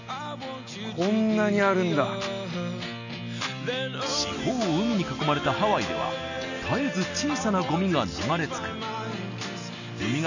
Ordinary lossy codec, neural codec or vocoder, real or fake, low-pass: none; none; real; 7.2 kHz